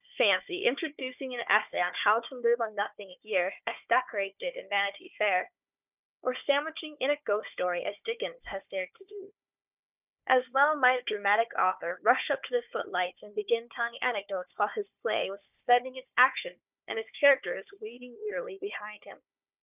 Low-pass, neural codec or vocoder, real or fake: 3.6 kHz; codec, 16 kHz, 4 kbps, FunCodec, trained on Chinese and English, 50 frames a second; fake